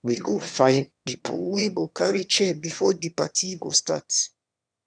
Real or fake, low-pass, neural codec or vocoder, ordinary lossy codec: fake; 9.9 kHz; autoencoder, 22.05 kHz, a latent of 192 numbers a frame, VITS, trained on one speaker; none